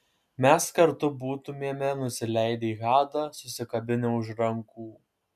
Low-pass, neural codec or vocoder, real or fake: 14.4 kHz; none; real